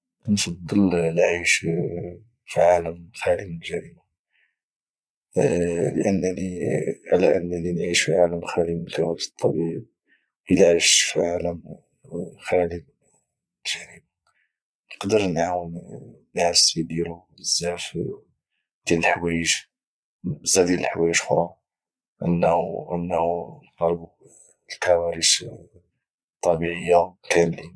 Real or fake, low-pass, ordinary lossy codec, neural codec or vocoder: fake; none; none; vocoder, 22.05 kHz, 80 mel bands, Vocos